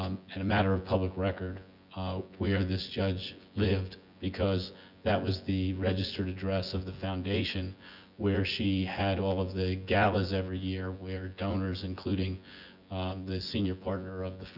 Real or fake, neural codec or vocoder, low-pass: fake; vocoder, 24 kHz, 100 mel bands, Vocos; 5.4 kHz